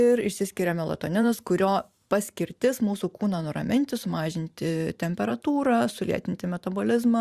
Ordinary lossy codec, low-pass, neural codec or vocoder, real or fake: Opus, 64 kbps; 14.4 kHz; vocoder, 44.1 kHz, 128 mel bands every 512 samples, BigVGAN v2; fake